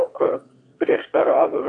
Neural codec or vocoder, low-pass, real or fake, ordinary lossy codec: autoencoder, 22.05 kHz, a latent of 192 numbers a frame, VITS, trained on one speaker; 9.9 kHz; fake; AAC, 48 kbps